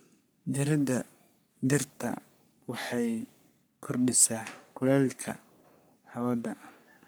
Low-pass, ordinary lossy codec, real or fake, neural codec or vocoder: none; none; fake; codec, 44.1 kHz, 3.4 kbps, Pupu-Codec